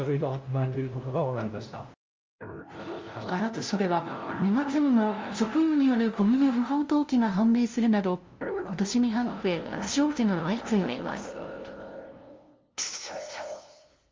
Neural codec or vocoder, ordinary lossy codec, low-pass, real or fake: codec, 16 kHz, 0.5 kbps, FunCodec, trained on LibriTTS, 25 frames a second; Opus, 32 kbps; 7.2 kHz; fake